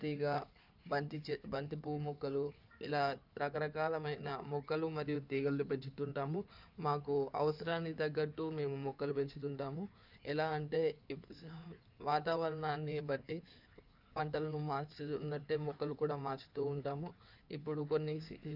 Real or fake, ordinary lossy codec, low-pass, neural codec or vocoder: fake; none; 5.4 kHz; codec, 16 kHz in and 24 kHz out, 2.2 kbps, FireRedTTS-2 codec